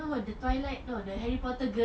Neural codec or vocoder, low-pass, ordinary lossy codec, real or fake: none; none; none; real